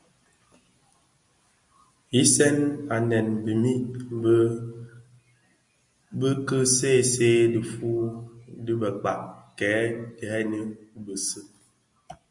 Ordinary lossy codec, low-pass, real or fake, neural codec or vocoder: Opus, 64 kbps; 10.8 kHz; real; none